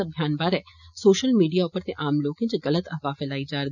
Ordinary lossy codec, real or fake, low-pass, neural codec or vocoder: none; real; 7.2 kHz; none